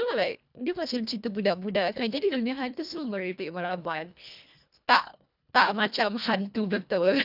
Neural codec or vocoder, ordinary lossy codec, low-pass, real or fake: codec, 24 kHz, 1.5 kbps, HILCodec; none; 5.4 kHz; fake